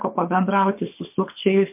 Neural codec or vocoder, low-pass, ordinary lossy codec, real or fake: codec, 24 kHz, 6 kbps, HILCodec; 3.6 kHz; MP3, 32 kbps; fake